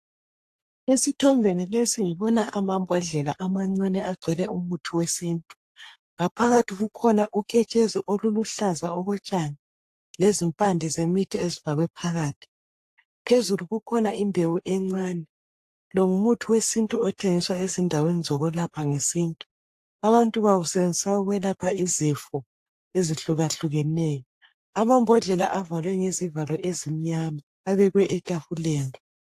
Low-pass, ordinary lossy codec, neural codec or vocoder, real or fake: 14.4 kHz; AAC, 64 kbps; codec, 44.1 kHz, 3.4 kbps, Pupu-Codec; fake